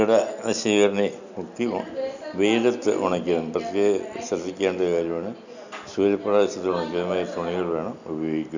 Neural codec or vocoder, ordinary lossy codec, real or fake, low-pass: none; none; real; 7.2 kHz